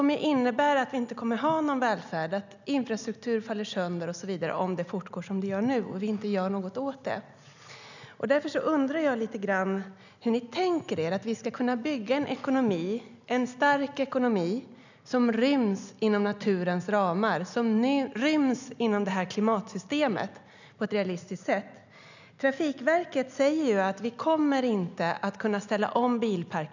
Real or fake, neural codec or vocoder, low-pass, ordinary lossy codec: real; none; 7.2 kHz; none